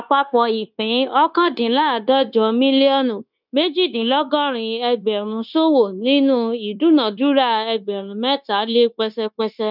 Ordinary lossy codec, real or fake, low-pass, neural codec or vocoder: none; fake; 5.4 kHz; codec, 16 kHz, 0.9 kbps, LongCat-Audio-Codec